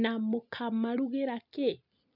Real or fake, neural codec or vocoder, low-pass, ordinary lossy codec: real; none; 5.4 kHz; none